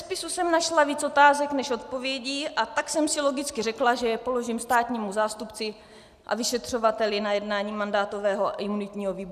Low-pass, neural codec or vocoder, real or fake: 14.4 kHz; none; real